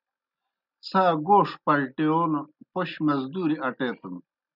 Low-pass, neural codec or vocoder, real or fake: 5.4 kHz; none; real